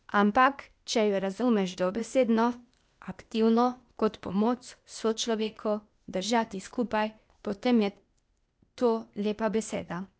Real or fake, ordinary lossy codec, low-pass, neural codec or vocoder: fake; none; none; codec, 16 kHz, 0.8 kbps, ZipCodec